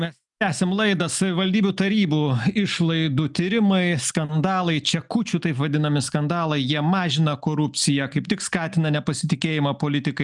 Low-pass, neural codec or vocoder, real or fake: 10.8 kHz; none; real